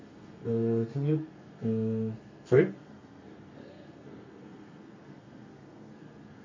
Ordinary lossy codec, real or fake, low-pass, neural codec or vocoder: MP3, 32 kbps; fake; 7.2 kHz; codec, 32 kHz, 1.9 kbps, SNAC